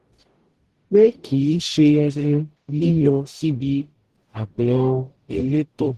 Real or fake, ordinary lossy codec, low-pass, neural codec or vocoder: fake; Opus, 16 kbps; 14.4 kHz; codec, 44.1 kHz, 0.9 kbps, DAC